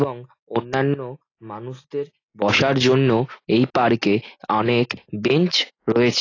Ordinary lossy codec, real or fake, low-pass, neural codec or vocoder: AAC, 32 kbps; real; 7.2 kHz; none